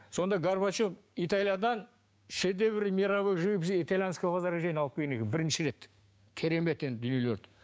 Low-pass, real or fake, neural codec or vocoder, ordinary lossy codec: none; fake; codec, 16 kHz, 6 kbps, DAC; none